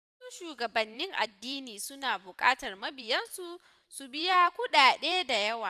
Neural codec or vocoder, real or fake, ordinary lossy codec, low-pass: vocoder, 44.1 kHz, 128 mel bands every 512 samples, BigVGAN v2; fake; none; 14.4 kHz